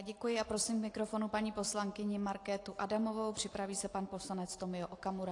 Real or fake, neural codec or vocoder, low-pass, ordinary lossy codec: real; none; 10.8 kHz; AAC, 48 kbps